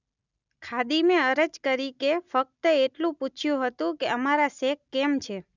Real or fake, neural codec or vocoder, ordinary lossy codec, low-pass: real; none; none; 7.2 kHz